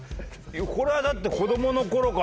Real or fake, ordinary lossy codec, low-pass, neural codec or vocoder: real; none; none; none